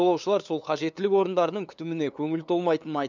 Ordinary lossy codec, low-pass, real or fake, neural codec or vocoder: none; 7.2 kHz; fake; codec, 16 kHz, 2 kbps, FunCodec, trained on LibriTTS, 25 frames a second